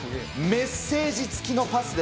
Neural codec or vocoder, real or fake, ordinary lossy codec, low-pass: none; real; none; none